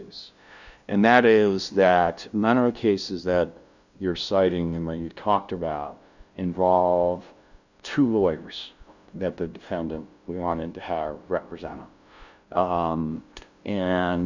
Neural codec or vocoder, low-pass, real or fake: codec, 16 kHz, 0.5 kbps, FunCodec, trained on LibriTTS, 25 frames a second; 7.2 kHz; fake